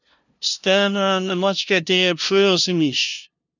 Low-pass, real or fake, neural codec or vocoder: 7.2 kHz; fake; codec, 16 kHz, 0.5 kbps, FunCodec, trained on LibriTTS, 25 frames a second